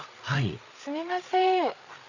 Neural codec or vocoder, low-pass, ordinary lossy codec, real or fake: codec, 16 kHz, 8 kbps, FreqCodec, larger model; 7.2 kHz; none; fake